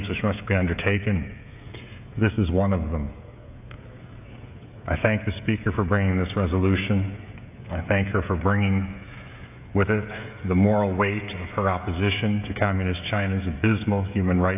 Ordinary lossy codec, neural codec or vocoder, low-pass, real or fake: AAC, 32 kbps; codec, 16 kHz, 16 kbps, FreqCodec, smaller model; 3.6 kHz; fake